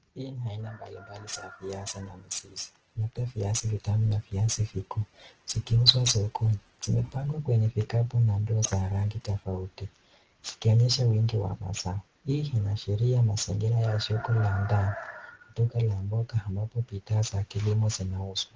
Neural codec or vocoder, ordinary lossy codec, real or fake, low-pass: none; Opus, 16 kbps; real; 7.2 kHz